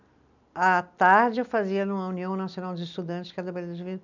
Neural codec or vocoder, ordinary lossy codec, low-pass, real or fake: none; none; 7.2 kHz; real